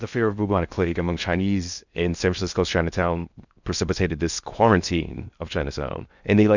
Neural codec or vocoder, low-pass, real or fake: codec, 16 kHz in and 24 kHz out, 0.6 kbps, FocalCodec, streaming, 2048 codes; 7.2 kHz; fake